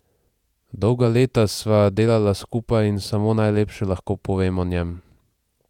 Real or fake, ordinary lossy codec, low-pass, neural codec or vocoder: fake; none; 19.8 kHz; vocoder, 48 kHz, 128 mel bands, Vocos